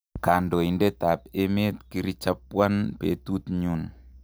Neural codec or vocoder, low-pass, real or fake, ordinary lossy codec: none; none; real; none